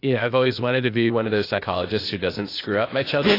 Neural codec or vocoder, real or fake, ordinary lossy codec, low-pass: codec, 16 kHz, 0.8 kbps, ZipCodec; fake; AAC, 24 kbps; 5.4 kHz